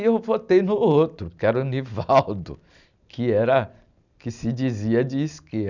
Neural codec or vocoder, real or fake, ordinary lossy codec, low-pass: none; real; none; 7.2 kHz